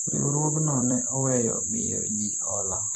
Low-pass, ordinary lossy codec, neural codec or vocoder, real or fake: 14.4 kHz; AAC, 64 kbps; vocoder, 44.1 kHz, 128 mel bands every 256 samples, BigVGAN v2; fake